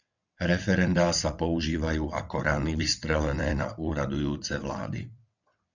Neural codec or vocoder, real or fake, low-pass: vocoder, 22.05 kHz, 80 mel bands, WaveNeXt; fake; 7.2 kHz